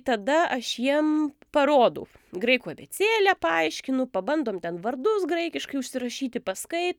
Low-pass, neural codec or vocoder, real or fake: 19.8 kHz; none; real